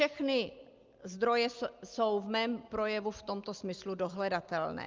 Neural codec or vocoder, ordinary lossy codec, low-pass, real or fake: none; Opus, 32 kbps; 7.2 kHz; real